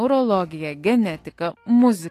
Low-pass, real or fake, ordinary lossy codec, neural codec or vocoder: 14.4 kHz; fake; AAC, 48 kbps; autoencoder, 48 kHz, 128 numbers a frame, DAC-VAE, trained on Japanese speech